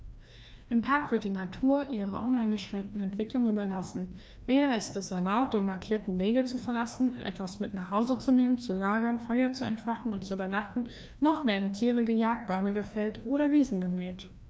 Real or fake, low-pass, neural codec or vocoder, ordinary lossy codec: fake; none; codec, 16 kHz, 1 kbps, FreqCodec, larger model; none